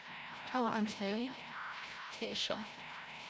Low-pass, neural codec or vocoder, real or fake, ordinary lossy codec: none; codec, 16 kHz, 0.5 kbps, FreqCodec, larger model; fake; none